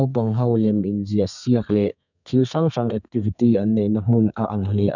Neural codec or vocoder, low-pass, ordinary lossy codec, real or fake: codec, 32 kHz, 1.9 kbps, SNAC; 7.2 kHz; none; fake